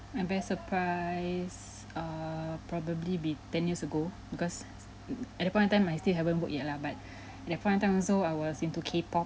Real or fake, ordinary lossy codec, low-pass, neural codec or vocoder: real; none; none; none